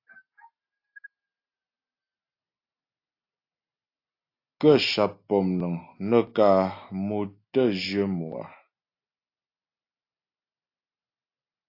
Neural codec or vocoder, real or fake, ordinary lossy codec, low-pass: none; real; AAC, 32 kbps; 5.4 kHz